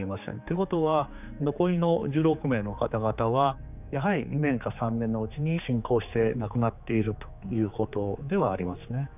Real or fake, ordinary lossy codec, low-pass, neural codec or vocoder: fake; none; 3.6 kHz; codec, 16 kHz, 4 kbps, X-Codec, HuBERT features, trained on general audio